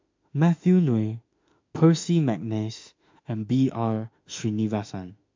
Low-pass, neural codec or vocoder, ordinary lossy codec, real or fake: 7.2 kHz; autoencoder, 48 kHz, 32 numbers a frame, DAC-VAE, trained on Japanese speech; MP3, 48 kbps; fake